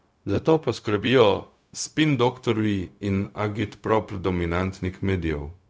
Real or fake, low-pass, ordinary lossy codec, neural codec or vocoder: fake; none; none; codec, 16 kHz, 0.4 kbps, LongCat-Audio-Codec